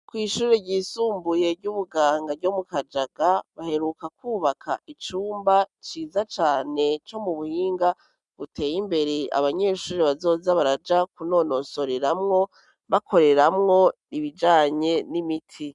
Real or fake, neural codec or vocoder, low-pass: real; none; 10.8 kHz